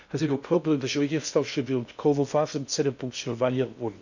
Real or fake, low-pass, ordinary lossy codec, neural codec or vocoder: fake; 7.2 kHz; none; codec, 16 kHz in and 24 kHz out, 0.6 kbps, FocalCodec, streaming, 2048 codes